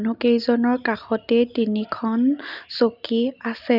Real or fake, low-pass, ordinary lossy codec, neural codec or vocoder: real; 5.4 kHz; none; none